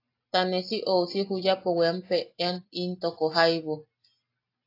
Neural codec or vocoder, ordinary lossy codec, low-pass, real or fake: none; AAC, 32 kbps; 5.4 kHz; real